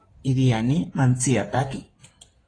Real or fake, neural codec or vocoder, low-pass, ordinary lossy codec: fake; codec, 16 kHz in and 24 kHz out, 2.2 kbps, FireRedTTS-2 codec; 9.9 kHz; AAC, 32 kbps